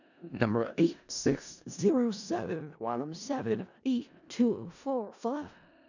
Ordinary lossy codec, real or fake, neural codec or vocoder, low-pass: none; fake; codec, 16 kHz in and 24 kHz out, 0.4 kbps, LongCat-Audio-Codec, four codebook decoder; 7.2 kHz